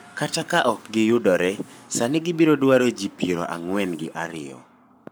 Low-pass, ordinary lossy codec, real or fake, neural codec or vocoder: none; none; fake; codec, 44.1 kHz, 7.8 kbps, Pupu-Codec